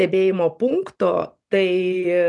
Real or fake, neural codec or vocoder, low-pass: real; none; 10.8 kHz